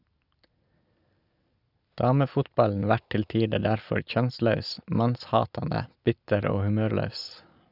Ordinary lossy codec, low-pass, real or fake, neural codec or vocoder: none; 5.4 kHz; real; none